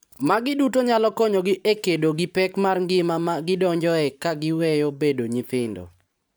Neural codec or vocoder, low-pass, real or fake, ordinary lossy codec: vocoder, 44.1 kHz, 128 mel bands every 512 samples, BigVGAN v2; none; fake; none